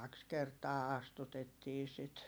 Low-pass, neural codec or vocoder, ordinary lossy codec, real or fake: none; vocoder, 44.1 kHz, 128 mel bands every 256 samples, BigVGAN v2; none; fake